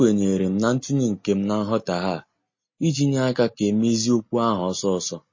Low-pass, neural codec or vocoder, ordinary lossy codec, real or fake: 7.2 kHz; none; MP3, 32 kbps; real